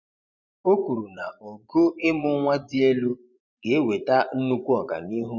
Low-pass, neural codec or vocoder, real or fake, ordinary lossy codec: 7.2 kHz; vocoder, 24 kHz, 100 mel bands, Vocos; fake; none